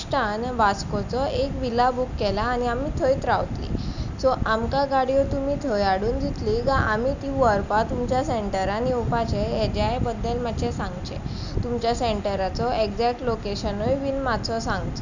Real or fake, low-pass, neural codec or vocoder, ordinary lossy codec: real; 7.2 kHz; none; none